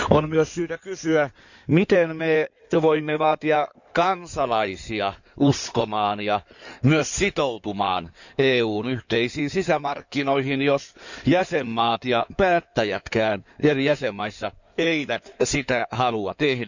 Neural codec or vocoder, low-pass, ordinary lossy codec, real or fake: codec, 16 kHz in and 24 kHz out, 2.2 kbps, FireRedTTS-2 codec; 7.2 kHz; none; fake